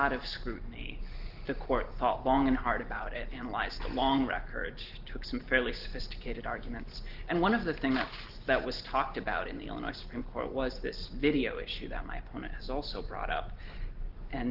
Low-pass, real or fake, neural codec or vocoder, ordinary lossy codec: 5.4 kHz; real; none; Opus, 16 kbps